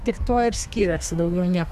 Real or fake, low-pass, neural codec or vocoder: fake; 14.4 kHz; codec, 32 kHz, 1.9 kbps, SNAC